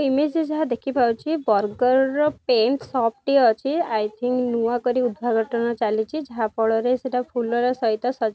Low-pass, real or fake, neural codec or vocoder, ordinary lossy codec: none; real; none; none